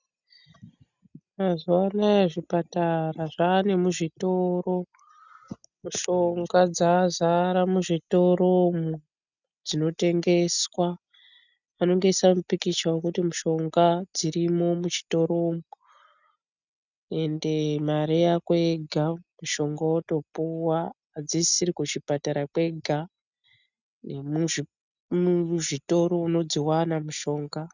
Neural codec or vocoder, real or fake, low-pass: none; real; 7.2 kHz